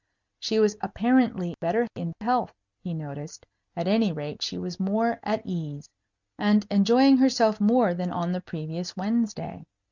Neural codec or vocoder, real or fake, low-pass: none; real; 7.2 kHz